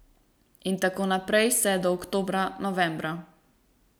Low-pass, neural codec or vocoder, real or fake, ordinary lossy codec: none; none; real; none